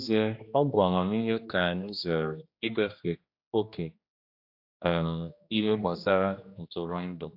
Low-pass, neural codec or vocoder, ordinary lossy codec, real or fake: 5.4 kHz; codec, 16 kHz, 1 kbps, X-Codec, HuBERT features, trained on general audio; AAC, 48 kbps; fake